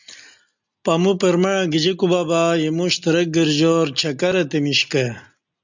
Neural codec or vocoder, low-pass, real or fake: none; 7.2 kHz; real